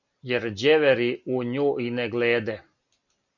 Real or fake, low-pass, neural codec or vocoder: real; 7.2 kHz; none